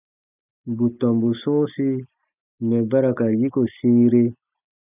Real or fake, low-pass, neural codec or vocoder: real; 3.6 kHz; none